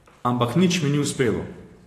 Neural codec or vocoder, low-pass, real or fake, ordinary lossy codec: vocoder, 44.1 kHz, 128 mel bands every 512 samples, BigVGAN v2; 14.4 kHz; fake; AAC, 48 kbps